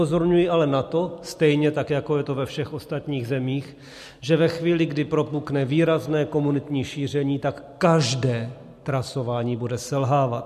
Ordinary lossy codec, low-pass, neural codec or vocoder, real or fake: MP3, 64 kbps; 14.4 kHz; none; real